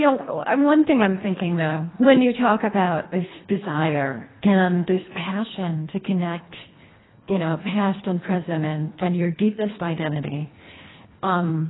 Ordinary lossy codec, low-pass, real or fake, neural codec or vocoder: AAC, 16 kbps; 7.2 kHz; fake; codec, 24 kHz, 1.5 kbps, HILCodec